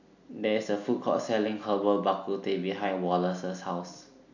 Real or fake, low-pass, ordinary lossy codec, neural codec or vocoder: real; 7.2 kHz; none; none